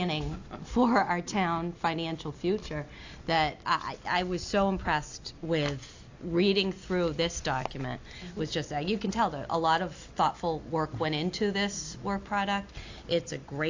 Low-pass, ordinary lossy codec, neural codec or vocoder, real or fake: 7.2 kHz; AAC, 48 kbps; none; real